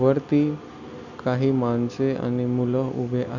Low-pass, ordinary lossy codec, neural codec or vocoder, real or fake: 7.2 kHz; none; none; real